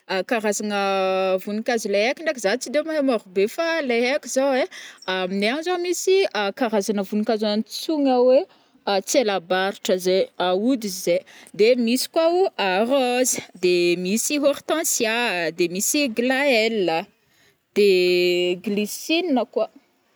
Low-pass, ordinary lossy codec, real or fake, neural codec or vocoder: none; none; real; none